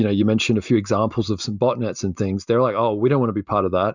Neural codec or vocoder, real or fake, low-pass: none; real; 7.2 kHz